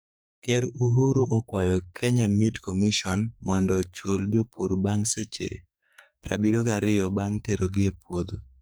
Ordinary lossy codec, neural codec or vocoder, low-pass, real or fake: none; codec, 44.1 kHz, 2.6 kbps, SNAC; none; fake